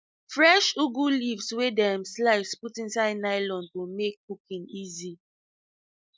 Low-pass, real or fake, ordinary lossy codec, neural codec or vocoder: none; real; none; none